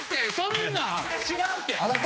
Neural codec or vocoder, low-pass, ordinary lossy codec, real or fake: codec, 16 kHz, 2 kbps, X-Codec, HuBERT features, trained on general audio; none; none; fake